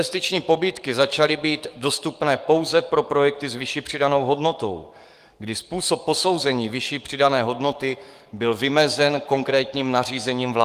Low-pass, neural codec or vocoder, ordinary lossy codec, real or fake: 14.4 kHz; codec, 44.1 kHz, 7.8 kbps, DAC; Opus, 32 kbps; fake